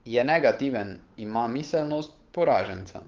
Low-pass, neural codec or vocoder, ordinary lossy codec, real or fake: 7.2 kHz; codec, 16 kHz, 8 kbps, FunCodec, trained on Chinese and English, 25 frames a second; Opus, 32 kbps; fake